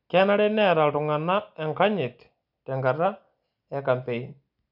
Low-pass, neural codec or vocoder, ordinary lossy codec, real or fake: 5.4 kHz; none; none; real